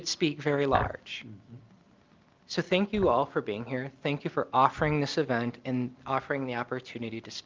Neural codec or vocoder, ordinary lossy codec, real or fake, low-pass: none; Opus, 32 kbps; real; 7.2 kHz